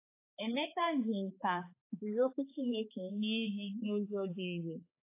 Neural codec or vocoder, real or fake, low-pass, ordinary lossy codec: codec, 16 kHz, 4 kbps, X-Codec, HuBERT features, trained on balanced general audio; fake; 3.6 kHz; AAC, 24 kbps